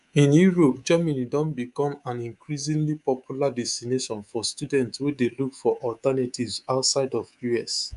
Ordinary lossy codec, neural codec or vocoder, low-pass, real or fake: none; codec, 24 kHz, 3.1 kbps, DualCodec; 10.8 kHz; fake